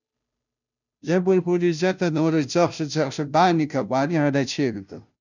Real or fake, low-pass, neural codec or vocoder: fake; 7.2 kHz; codec, 16 kHz, 0.5 kbps, FunCodec, trained on Chinese and English, 25 frames a second